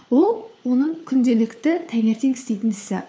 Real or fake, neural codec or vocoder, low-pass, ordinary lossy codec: fake; codec, 16 kHz, 4 kbps, FunCodec, trained on LibriTTS, 50 frames a second; none; none